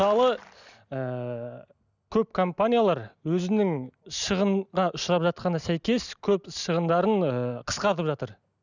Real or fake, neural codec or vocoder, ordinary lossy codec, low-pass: real; none; none; 7.2 kHz